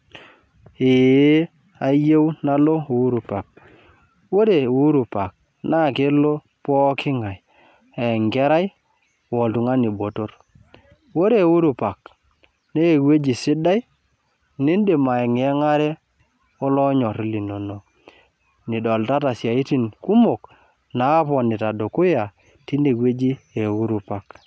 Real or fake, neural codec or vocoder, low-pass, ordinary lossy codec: real; none; none; none